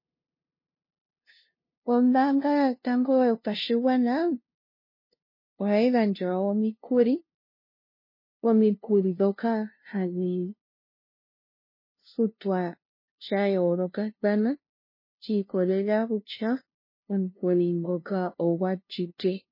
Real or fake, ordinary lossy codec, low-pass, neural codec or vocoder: fake; MP3, 24 kbps; 5.4 kHz; codec, 16 kHz, 0.5 kbps, FunCodec, trained on LibriTTS, 25 frames a second